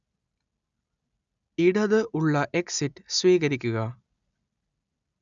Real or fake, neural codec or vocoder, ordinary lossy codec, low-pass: real; none; none; 7.2 kHz